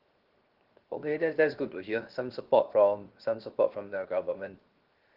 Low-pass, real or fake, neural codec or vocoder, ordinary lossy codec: 5.4 kHz; fake; codec, 16 kHz, 0.7 kbps, FocalCodec; Opus, 16 kbps